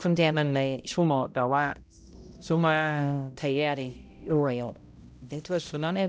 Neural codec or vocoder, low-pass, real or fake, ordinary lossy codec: codec, 16 kHz, 0.5 kbps, X-Codec, HuBERT features, trained on balanced general audio; none; fake; none